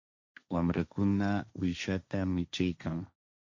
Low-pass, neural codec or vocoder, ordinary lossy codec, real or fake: 7.2 kHz; codec, 16 kHz, 1.1 kbps, Voila-Tokenizer; MP3, 48 kbps; fake